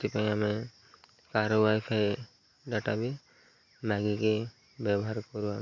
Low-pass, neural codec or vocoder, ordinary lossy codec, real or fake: 7.2 kHz; none; MP3, 48 kbps; real